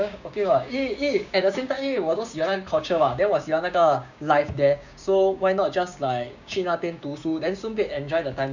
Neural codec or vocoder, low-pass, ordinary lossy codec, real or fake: codec, 16 kHz, 6 kbps, DAC; 7.2 kHz; none; fake